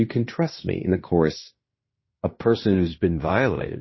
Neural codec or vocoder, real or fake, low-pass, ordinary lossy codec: codec, 16 kHz in and 24 kHz out, 0.9 kbps, LongCat-Audio-Codec, fine tuned four codebook decoder; fake; 7.2 kHz; MP3, 24 kbps